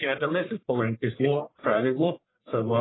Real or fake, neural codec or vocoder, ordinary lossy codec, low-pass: fake; codec, 44.1 kHz, 1.7 kbps, Pupu-Codec; AAC, 16 kbps; 7.2 kHz